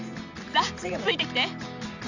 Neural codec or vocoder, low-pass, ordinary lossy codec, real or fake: none; 7.2 kHz; Opus, 64 kbps; real